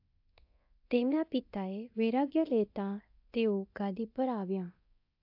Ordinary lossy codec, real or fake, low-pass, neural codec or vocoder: AAC, 48 kbps; fake; 5.4 kHz; codec, 24 kHz, 0.9 kbps, DualCodec